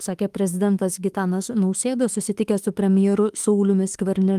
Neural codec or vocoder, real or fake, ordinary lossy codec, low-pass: autoencoder, 48 kHz, 32 numbers a frame, DAC-VAE, trained on Japanese speech; fake; Opus, 24 kbps; 14.4 kHz